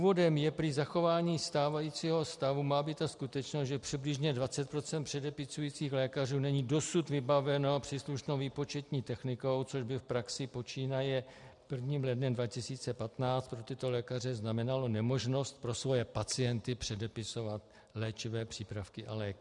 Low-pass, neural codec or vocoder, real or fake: 10.8 kHz; none; real